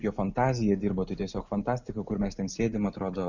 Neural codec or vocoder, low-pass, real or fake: none; 7.2 kHz; real